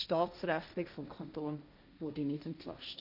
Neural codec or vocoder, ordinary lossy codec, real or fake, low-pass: codec, 16 kHz, 1.1 kbps, Voila-Tokenizer; none; fake; 5.4 kHz